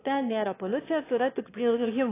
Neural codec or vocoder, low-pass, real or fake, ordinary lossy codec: autoencoder, 22.05 kHz, a latent of 192 numbers a frame, VITS, trained on one speaker; 3.6 kHz; fake; AAC, 24 kbps